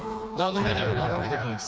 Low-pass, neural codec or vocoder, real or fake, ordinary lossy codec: none; codec, 16 kHz, 2 kbps, FreqCodec, smaller model; fake; none